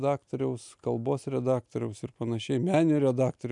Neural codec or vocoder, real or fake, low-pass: none; real; 10.8 kHz